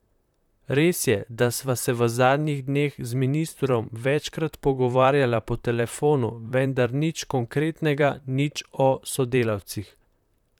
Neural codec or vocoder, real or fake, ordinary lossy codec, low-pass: vocoder, 44.1 kHz, 128 mel bands, Pupu-Vocoder; fake; none; 19.8 kHz